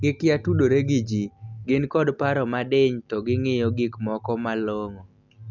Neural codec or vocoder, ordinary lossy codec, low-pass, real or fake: none; none; 7.2 kHz; real